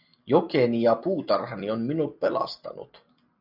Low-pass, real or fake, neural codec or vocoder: 5.4 kHz; real; none